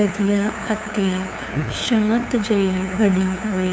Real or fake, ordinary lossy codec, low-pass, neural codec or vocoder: fake; none; none; codec, 16 kHz, 2 kbps, FunCodec, trained on LibriTTS, 25 frames a second